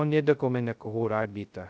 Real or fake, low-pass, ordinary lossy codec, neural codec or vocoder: fake; none; none; codec, 16 kHz, 0.2 kbps, FocalCodec